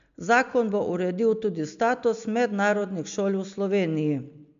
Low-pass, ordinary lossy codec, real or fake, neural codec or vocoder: 7.2 kHz; MP3, 64 kbps; real; none